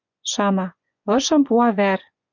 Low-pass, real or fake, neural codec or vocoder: 7.2 kHz; fake; vocoder, 24 kHz, 100 mel bands, Vocos